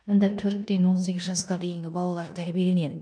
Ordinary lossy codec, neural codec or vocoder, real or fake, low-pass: none; codec, 16 kHz in and 24 kHz out, 0.9 kbps, LongCat-Audio-Codec, four codebook decoder; fake; 9.9 kHz